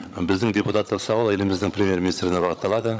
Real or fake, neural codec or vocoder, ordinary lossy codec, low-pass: fake; codec, 16 kHz, 16 kbps, FreqCodec, larger model; none; none